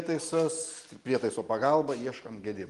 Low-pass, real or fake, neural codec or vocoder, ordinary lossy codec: 10.8 kHz; real; none; Opus, 24 kbps